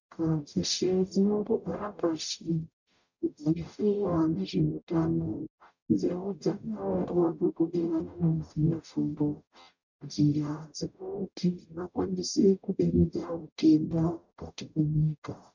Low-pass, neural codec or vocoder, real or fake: 7.2 kHz; codec, 44.1 kHz, 0.9 kbps, DAC; fake